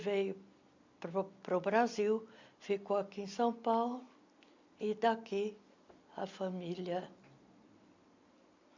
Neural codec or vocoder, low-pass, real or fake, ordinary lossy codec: none; 7.2 kHz; real; MP3, 64 kbps